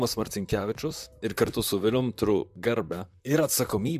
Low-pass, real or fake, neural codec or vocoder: 14.4 kHz; fake; vocoder, 44.1 kHz, 128 mel bands, Pupu-Vocoder